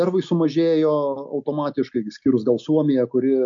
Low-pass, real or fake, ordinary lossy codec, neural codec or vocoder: 7.2 kHz; real; MP3, 48 kbps; none